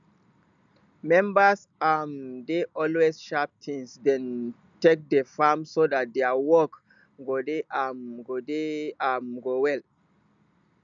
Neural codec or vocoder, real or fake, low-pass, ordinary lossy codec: none; real; 7.2 kHz; none